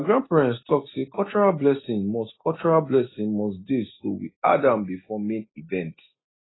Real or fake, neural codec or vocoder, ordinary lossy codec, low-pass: real; none; AAC, 16 kbps; 7.2 kHz